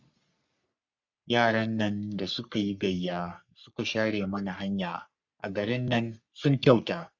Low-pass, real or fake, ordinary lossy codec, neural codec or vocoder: 7.2 kHz; fake; Opus, 64 kbps; codec, 44.1 kHz, 3.4 kbps, Pupu-Codec